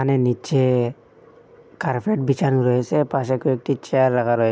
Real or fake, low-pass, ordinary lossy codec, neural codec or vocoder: real; none; none; none